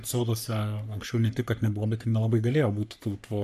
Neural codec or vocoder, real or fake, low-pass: codec, 44.1 kHz, 3.4 kbps, Pupu-Codec; fake; 14.4 kHz